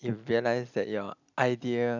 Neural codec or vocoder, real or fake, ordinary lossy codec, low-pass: none; real; none; 7.2 kHz